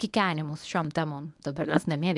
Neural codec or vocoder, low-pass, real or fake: codec, 24 kHz, 0.9 kbps, WavTokenizer, medium speech release version 1; 10.8 kHz; fake